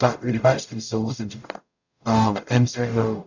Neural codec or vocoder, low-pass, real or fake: codec, 44.1 kHz, 0.9 kbps, DAC; 7.2 kHz; fake